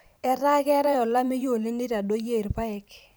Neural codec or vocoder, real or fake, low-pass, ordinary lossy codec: vocoder, 44.1 kHz, 128 mel bands every 512 samples, BigVGAN v2; fake; none; none